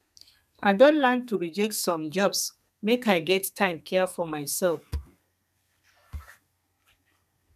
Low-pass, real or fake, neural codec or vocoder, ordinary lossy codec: 14.4 kHz; fake; codec, 32 kHz, 1.9 kbps, SNAC; none